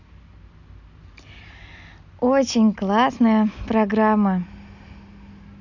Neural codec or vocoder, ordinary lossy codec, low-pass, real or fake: none; none; 7.2 kHz; real